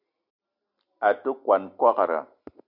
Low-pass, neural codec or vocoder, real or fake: 5.4 kHz; none; real